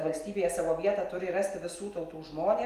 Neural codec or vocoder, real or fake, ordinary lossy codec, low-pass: none; real; Opus, 32 kbps; 14.4 kHz